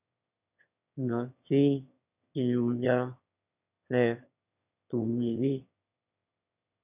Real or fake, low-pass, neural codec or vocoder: fake; 3.6 kHz; autoencoder, 22.05 kHz, a latent of 192 numbers a frame, VITS, trained on one speaker